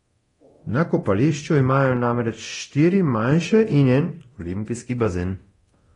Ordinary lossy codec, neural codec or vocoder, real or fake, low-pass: AAC, 32 kbps; codec, 24 kHz, 0.9 kbps, DualCodec; fake; 10.8 kHz